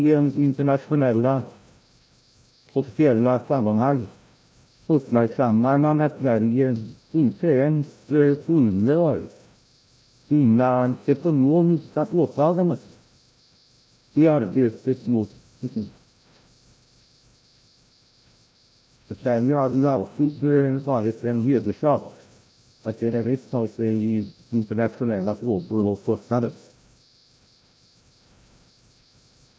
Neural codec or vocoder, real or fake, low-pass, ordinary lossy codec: codec, 16 kHz, 0.5 kbps, FreqCodec, larger model; fake; none; none